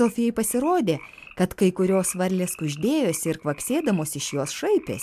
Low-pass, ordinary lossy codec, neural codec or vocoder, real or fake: 14.4 kHz; MP3, 96 kbps; none; real